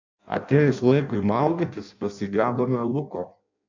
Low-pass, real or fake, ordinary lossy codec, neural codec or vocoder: 7.2 kHz; fake; MP3, 64 kbps; codec, 16 kHz in and 24 kHz out, 0.6 kbps, FireRedTTS-2 codec